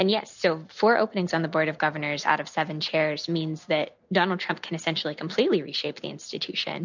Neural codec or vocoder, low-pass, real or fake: none; 7.2 kHz; real